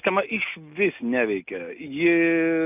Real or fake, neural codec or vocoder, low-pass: real; none; 3.6 kHz